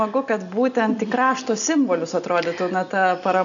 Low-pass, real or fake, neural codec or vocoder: 7.2 kHz; real; none